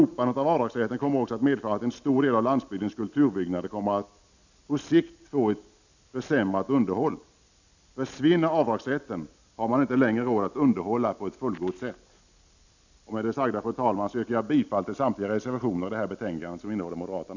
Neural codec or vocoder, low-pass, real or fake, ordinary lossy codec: none; 7.2 kHz; real; none